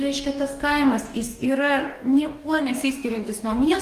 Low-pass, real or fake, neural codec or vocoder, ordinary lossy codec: 14.4 kHz; fake; codec, 44.1 kHz, 2.6 kbps, DAC; Opus, 32 kbps